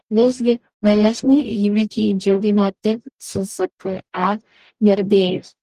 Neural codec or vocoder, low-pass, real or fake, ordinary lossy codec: codec, 44.1 kHz, 0.9 kbps, DAC; 14.4 kHz; fake; Opus, 24 kbps